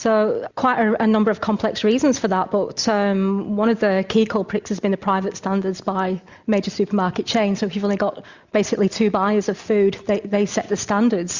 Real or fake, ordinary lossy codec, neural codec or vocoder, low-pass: real; Opus, 64 kbps; none; 7.2 kHz